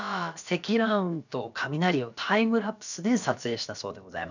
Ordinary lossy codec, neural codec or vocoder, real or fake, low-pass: none; codec, 16 kHz, about 1 kbps, DyCAST, with the encoder's durations; fake; 7.2 kHz